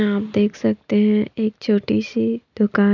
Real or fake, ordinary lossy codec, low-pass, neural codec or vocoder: real; none; 7.2 kHz; none